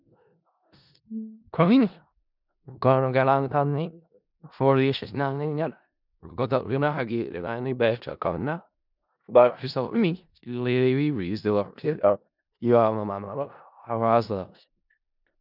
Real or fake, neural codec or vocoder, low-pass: fake; codec, 16 kHz in and 24 kHz out, 0.4 kbps, LongCat-Audio-Codec, four codebook decoder; 5.4 kHz